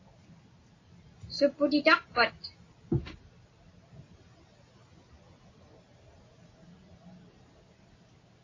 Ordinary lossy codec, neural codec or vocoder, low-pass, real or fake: AAC, 32 kbps; none; 7.2 kHz; real